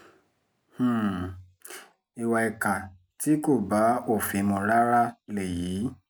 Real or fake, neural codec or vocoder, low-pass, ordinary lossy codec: real; none; none; none